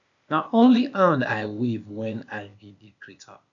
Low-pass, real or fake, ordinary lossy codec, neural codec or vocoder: 7.2 kHz; fake; none; codec, 16 kHz, 0.8 kbps, ZipCodec